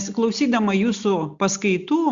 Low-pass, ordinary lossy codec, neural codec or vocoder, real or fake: 7.2 kHz; Opus, 64 kbps; none; real